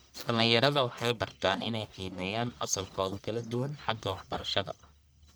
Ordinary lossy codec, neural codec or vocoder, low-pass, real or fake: none; codec, 44.1 kHz, 1.7 kbps, Pupu-Codec; none; fake